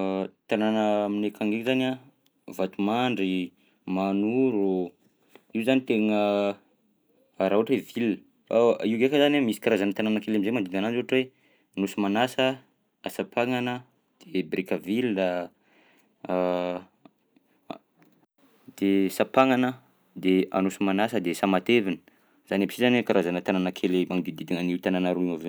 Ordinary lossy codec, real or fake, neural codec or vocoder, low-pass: none; real; none; none